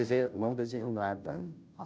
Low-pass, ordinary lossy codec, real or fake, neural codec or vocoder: none; none; fake; codec, 16 kHz, 0.5 kbps, FunCodec, trained on Chinese and English, 25 frames a second